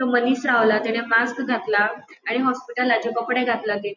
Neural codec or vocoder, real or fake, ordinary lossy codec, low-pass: none; real; none; 7.2 kHz